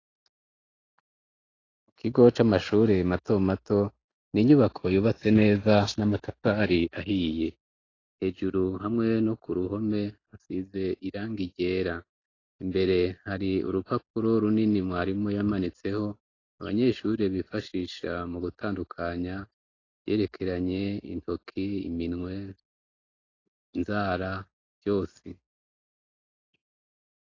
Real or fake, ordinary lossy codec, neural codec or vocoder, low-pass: real; AAC, 32 kbps; none; 7.2 kHz